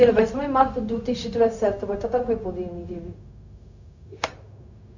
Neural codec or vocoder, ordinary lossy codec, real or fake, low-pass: codec, 16 kHz, 0.4 kbps, LongCat-Audio-Codec; Opus, 64 kbps; fake; 7.2 kHz